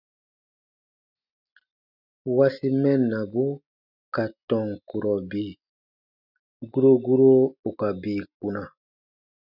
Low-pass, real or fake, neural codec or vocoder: 5.4 kHz; real; none